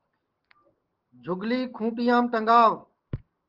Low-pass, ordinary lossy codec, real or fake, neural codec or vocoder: 5.4 kHz; Opus, 16 kbps; real; none